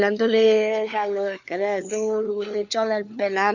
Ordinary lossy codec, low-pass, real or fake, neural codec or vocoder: none; 7.2 kHz; fake; codec, 16 kHz, 4 kbps, FunCodec, trained on LibriTTS, 50 frames a second